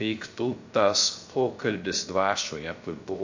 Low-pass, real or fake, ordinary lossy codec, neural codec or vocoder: 7.2 kHz; fake; AAC, 48 kbps; codec, 16 kHz, 0.3 kbps, FocalCodec